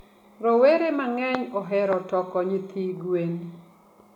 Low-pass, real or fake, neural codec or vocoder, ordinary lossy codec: 19.8 kHz; real; none; none